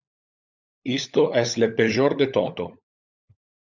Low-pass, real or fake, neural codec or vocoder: 7.2 kHz; fake; codec, 16 kHz, 16 kbps, FunCodec, trained on LibriTTS, 50 frames a second